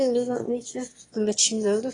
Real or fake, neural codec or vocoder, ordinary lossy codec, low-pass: fake; autoencoder, 22.05 kHz, a latent of 192 numbers a frame, VITS, trained on one speaker; AAC, 32 kbps; 9.9 kHz